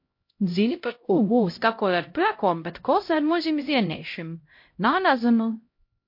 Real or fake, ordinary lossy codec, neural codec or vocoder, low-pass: fake; MP3, 32 kbps; codec, 16 kHz, 0.5 kbps, X-Codec, HuBERT features, trained on LibriSpeech; 5.4 kHz